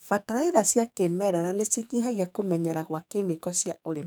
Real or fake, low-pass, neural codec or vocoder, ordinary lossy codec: fake; none; codec, 44.1 kHz, 2.6 kbps, SNAC; none